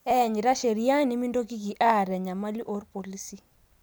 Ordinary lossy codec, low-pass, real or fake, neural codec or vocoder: none; none; real; none